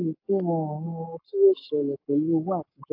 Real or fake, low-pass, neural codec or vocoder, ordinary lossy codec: fake; 5.4 kHz; codec, 44.1 kHz, 7.8 kbps, Pupu-Codec; AAC, 32 kbps